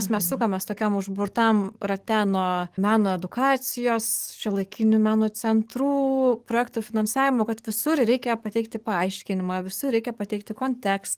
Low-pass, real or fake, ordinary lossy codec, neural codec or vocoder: 14.4 kHz; fake; Opus, 24 kbps; codec, 44.1 kHz, 7.8 kbps, DAC